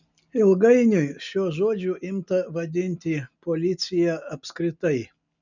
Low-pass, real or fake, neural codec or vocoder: 7.2 kHz; real; none